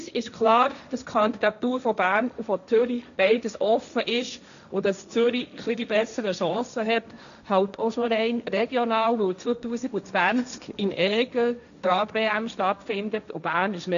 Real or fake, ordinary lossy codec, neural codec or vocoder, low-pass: fake; none; codec, 16 kHz, 1.1 kbps, Voila-Tokenizer; 7.2 kHz